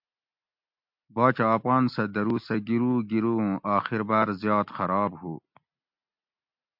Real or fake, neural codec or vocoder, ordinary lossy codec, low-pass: real; none; MP3, 48 kbps; 5.4 kHz